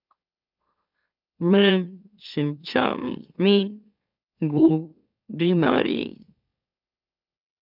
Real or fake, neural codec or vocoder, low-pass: fake; autoencoder, 44.1 kHz, a latent of 192 numbers a frame, MeloTTS; 5.4 kHz